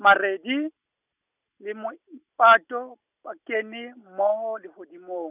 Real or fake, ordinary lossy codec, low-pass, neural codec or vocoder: real; none; 3.6 kHz; none